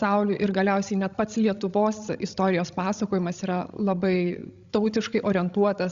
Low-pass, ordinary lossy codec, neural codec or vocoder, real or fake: 7.2 kHz; Opus, 64 kbps; codec, 16 kHz, 16 kbps, FreqCodec, larger model; fake